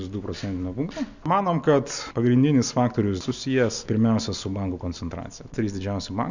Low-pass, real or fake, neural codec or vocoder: 7.2 kHz; real; none